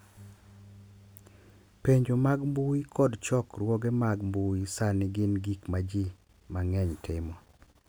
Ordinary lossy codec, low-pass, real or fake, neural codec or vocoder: none; none; real; none